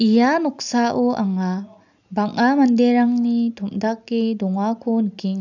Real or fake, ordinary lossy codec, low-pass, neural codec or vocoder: real; none; 7.2 kHz; none